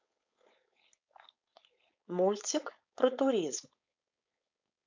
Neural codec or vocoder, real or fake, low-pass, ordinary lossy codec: codec, 16 kHz, 4.8 kbps, FACodec; fake; 7.2 kHz; MP3, 64 kbps